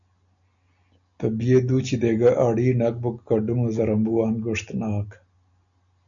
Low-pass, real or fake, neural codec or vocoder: 7.2 kHz; real; none